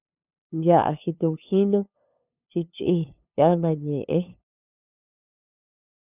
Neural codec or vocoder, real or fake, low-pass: codec, 16 kHz, 2 kbps, FunCodec, trained on LibriTTS, 25 frames a second; fake; 3.6 kHz